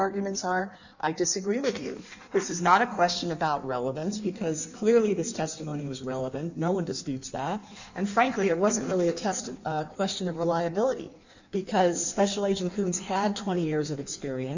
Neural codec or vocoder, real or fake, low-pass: codec, 16 kHz in and 24 kHz out, 1.1 kbps, FireRedTTS-2 codec; fake; 7.2 kHz